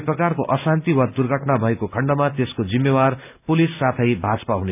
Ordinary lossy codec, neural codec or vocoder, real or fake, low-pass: none; none; real; 3.6 kHz